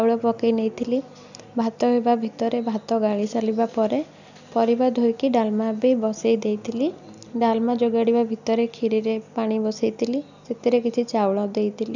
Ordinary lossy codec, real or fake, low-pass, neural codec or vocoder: none; real; 7.2 kHz; none